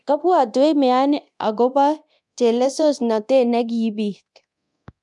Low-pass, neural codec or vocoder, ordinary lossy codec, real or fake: 10.8 kHz; codec, 24 kHz, 0.9 kbps, DualCodec; none; fake